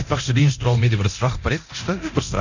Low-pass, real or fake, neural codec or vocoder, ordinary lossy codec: 7.2 kHz; fake; codec, 24 kHz, 0.9 kbps, DualCodec; none